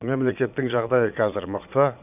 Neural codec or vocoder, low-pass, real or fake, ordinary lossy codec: vocoder, 22.05 kHz, 80 mel bands, Vocos; 3.6 kHz; fake; none